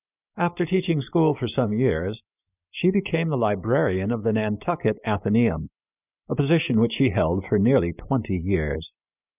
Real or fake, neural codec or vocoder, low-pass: real; none; 3.6 kHz